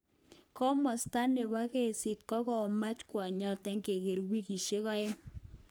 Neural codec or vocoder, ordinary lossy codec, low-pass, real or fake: codec, 44.1 kHz, 3.4 kbps, Pupu-Codec; none; none; fake